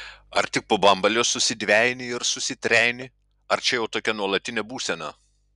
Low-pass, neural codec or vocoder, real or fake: 10.8 kHz; none; real